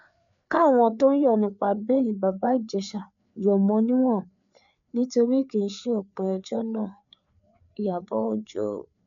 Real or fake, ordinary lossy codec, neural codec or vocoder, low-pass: fake; none; codec, 16 kHz, 4 kbps, FreqCodec, larger model; 7.2 kHz